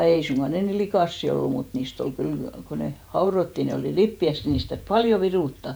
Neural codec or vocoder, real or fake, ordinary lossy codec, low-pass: vocoder, 48 kHz, 128 mel bands, Vocos; fake; none; none